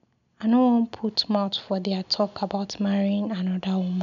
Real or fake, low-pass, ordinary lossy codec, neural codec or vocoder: real; 7.2 kHz; none; none